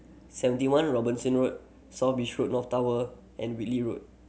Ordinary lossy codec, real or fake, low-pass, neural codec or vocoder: none; real; none; none